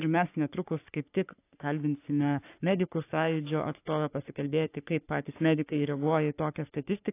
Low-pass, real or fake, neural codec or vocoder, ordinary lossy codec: 3.6 kHz; fake; codec, 44.1 kHz, 3.4 kbps, Pupu-Codec; AAC, 24 kbps